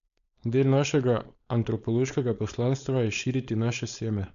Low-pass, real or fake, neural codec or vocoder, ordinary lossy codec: 7.2 kHz; fake; codec, 16 kHz, 4.8 kbps, FACodec; none